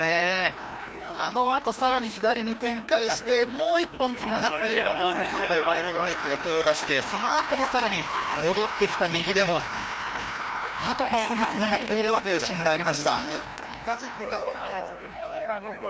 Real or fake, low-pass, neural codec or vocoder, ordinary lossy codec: fake; none; codec, 16 kHz, 1 kbps, FreqCodec, larger model; none